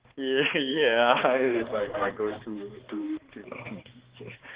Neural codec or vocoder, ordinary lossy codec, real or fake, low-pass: codec, 16 kHz, 2 kbps, X-Codec, HuBERT features, trained on balanced general audio; Opus, 16 kbps; fake; 3.6 kHz